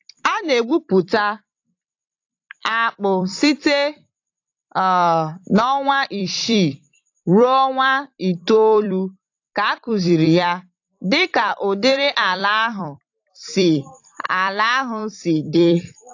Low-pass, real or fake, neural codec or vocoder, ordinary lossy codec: 7.2 kHz; fake; vocoder, 44.1 kHz, 128 mel bands every 256 samples, BigVGAN v2; AAC, 48 kbps